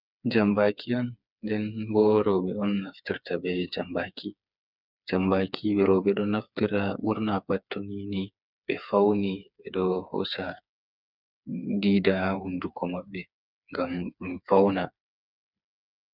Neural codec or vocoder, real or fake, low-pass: codec, 16 kHz, 4 kbps, FreqCodec, smaller model; fake; 5.4 kHz